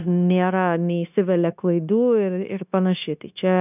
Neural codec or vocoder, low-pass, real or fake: codec, 16 kHz, 0.9 kbps, LongCat-Audio-Codec; 3.6 kHz; fake